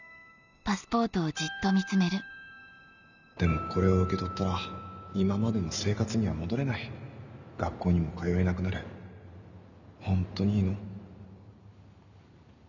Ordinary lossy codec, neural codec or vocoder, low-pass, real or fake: none; none; 7.2 kHz; real